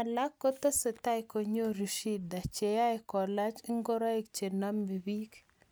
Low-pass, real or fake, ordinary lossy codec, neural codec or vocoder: none; real; none; none